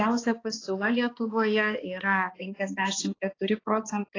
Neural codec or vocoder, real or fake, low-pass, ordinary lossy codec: codec, 16 kHz, 2 kbps, X-Codec, HuBERT features, trained on balanced general audio; fake; 7.2 kHz; AAC, 32 kbps